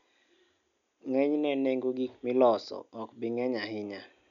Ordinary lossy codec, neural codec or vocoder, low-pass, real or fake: none; none; 7.2 kHz; real